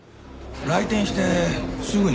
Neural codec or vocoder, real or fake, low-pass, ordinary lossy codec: none; real; none; none